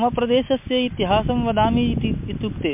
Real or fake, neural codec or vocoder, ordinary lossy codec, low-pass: real; none; MP3, 32 kbps; 3.6 kHz